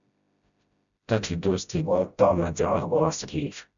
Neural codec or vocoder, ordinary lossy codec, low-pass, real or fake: codec, 16 kHz, 0.5 kbps, FreqCodec, smaller model; MP3, 96 kbps; 7.2 kHz; fake